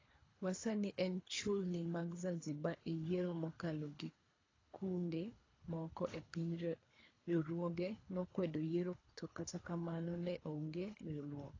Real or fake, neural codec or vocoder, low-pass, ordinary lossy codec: fake; codec, 24 kHz, 3 kbps, HILCodec; 7.2 kHz; AAC, 32 kbps